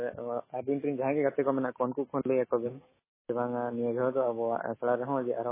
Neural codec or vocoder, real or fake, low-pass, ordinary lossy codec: autoencoder, 48 kHz, 128 numbers a frame, DAC-VAE, trained on Japanese speech; fake; 3.6 kHz; MP3, 16 kbps